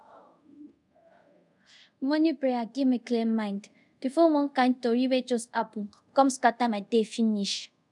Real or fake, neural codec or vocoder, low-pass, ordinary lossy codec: fake; codec, 24 kHz, 0.5 kbps, DualCodec; 10.8 kHz; none